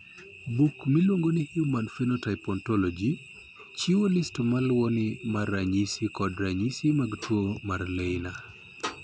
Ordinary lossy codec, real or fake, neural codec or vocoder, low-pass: none; real; none; none